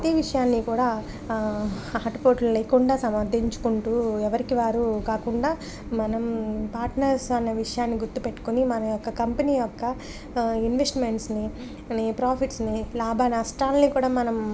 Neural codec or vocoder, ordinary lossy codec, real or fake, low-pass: none; none; real; none